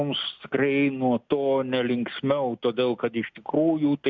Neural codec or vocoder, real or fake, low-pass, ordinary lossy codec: none; real; 7.2 kHz; MP3, 64 kbps